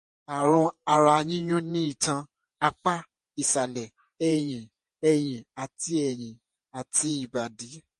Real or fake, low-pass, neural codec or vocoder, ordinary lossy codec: fake; 14.4 kHz; vocoder, 48 kHz, 128 mel bands, Vocos; MP3, 48 kbps